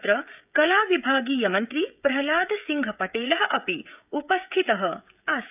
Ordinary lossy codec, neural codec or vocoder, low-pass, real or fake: none; codec, 16 kHz, 16 kbps, FreqCodec, smaller model; 3.6 kHz; fake